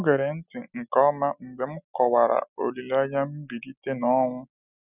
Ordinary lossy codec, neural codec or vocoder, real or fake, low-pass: none; none; real; 3.6 kHz